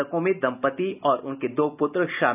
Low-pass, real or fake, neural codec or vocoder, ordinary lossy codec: 3.6 kHz; real; none; none